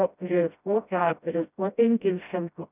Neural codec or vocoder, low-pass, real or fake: codec, 16 kHz, 0.5 kbps, FreqCodec, smaller model; 3.6 kHz; fake